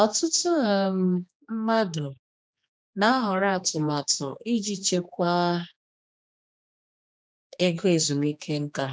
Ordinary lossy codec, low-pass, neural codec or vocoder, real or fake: none; none; codec, 16 kHz, 2 kbps, X-Codec, HuBERT features, trained on general audio; fake